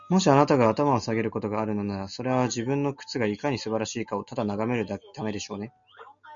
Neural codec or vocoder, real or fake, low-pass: none; real; 7.2 kHz